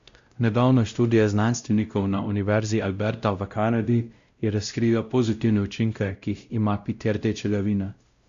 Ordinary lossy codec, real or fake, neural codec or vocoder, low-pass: Opus, 64 kbps; fake; codec, 16 kHz, 0.5 kbps, X-Codec, WavLM features, trained on Multilingual LibriSpeech; 7.2 kHz